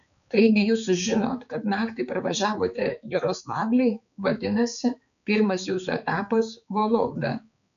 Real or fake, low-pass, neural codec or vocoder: fake; 7.2 kHz; codec, 16 kHz, 4 kbps, X-Codec, HuBERT features, trained on balanced general audio